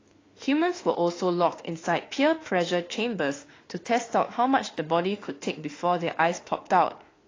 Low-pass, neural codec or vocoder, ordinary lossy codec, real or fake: 7.2 kHz; autoencoder, 48 kHz, 32 numbers a frame, DAC-VAE, trained on Japanese speech; AAC, 32 kbps; fake